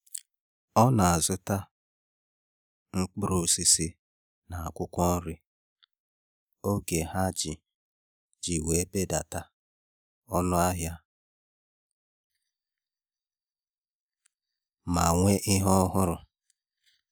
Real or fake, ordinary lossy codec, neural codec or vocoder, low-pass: real; none; none; none